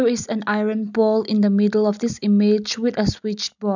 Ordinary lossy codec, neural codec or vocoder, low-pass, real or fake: none; none; 7.2 kHz; real